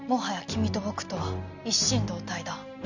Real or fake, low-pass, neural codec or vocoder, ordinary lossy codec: real; 7.2 kHz; none; none